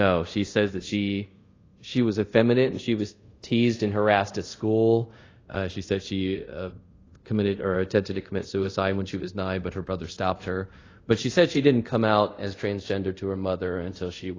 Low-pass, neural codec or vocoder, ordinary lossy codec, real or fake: 7.2 kHz; codec, 24 kHz, 0.5 kbps, DualCodec; AAC, 32 kbps; fake